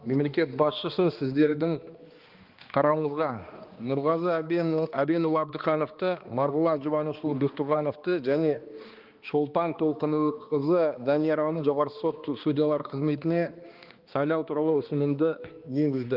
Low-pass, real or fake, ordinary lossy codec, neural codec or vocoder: 5.4 kHz; fake; Opus, 32 kbps; codec, 16 kHz, 2 kbps, X-Codec, HuBERT features, trained on balanced general audio